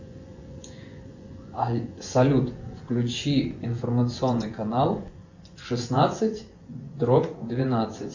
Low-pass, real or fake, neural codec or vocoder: 7.2 kHz; fake; vocoder, 24 kHz, 100 mel bands, Vocos